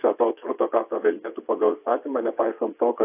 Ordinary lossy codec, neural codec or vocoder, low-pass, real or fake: AAC, 32 kbps; vocoder, 22.05 kHz, 80 mel bands, WaveNeXt; 3.6 kHz; fake